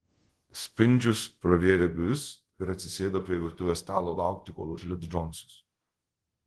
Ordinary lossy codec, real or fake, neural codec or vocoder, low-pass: Opus, 16 kbps; fake; codec, 24 kHz, 0.5 kbps, DualCodec; 10.8 kHz